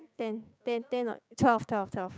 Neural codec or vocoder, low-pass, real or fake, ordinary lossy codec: codec, 16 kHz, 6 kbps, DAC; none; fake; none